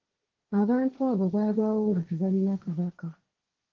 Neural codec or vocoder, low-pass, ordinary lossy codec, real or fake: codec, 16 kHz, 1.1 kbps, Voila-Tokenizer; 7.2 kHz; Opus, 16 kbps; fake